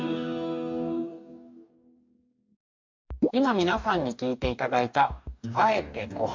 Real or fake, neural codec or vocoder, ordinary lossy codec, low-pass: fake; codec, 44.1 kHz, 2.6 kbps, DAC; MP3, 48 kbps; 7.2 kHz